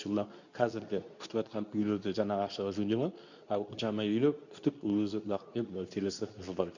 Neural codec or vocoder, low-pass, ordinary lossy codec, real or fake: codec, 24 kHz, 0.9 kbps, WavTokenizer, medium speech release version 2; 7.2 kHz; none; fake